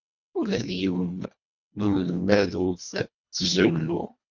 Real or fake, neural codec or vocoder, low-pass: fake; codec, 24 kHz, 1.5 kbps, HILCodec; 7.2 kHz